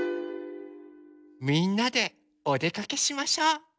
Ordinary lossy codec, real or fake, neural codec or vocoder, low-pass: none; real; none; none